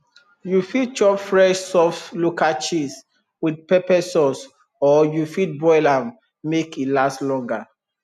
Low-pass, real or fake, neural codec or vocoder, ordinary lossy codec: 14.4 kHz; real; none; none